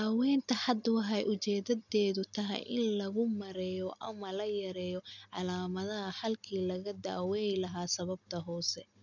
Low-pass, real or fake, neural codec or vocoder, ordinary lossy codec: 7.2 kHz; real; none; none